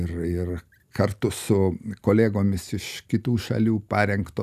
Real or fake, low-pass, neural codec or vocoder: real; 14.4 kHz; none